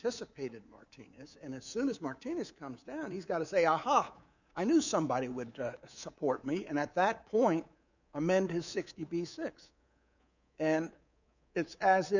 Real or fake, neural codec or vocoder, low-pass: fake; codec, 24 kHz, 3.1 kbps, DualCodec; 7.2 kHz